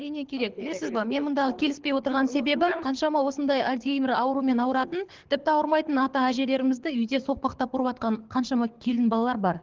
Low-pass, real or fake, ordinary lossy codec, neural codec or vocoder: 7.2 kHz; fake; Opus, 16 kbps; codec, 24 kHz, 6 kbps, HILCodec